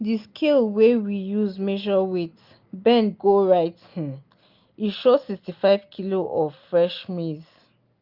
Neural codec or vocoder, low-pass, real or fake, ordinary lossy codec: none; 5.4 kHz; real; Opus, 24 kbps